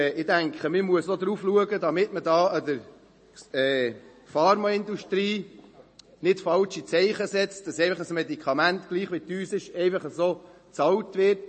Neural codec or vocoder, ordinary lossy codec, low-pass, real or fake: none; MP3, 32 kbps; 10.8 kHz; real